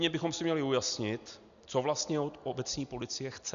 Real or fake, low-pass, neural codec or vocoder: real; 7.2 kHz; none